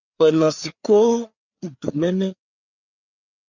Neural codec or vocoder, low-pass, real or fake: codec, 16 kHz, 4 kbps, FreqCodec, larger model; 7.2 kHz; fake